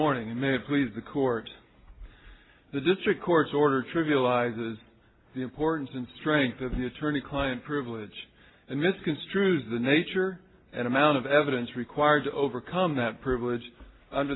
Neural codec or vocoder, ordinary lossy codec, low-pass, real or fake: none; AAC, 16 kbps; 7.2 kHz; real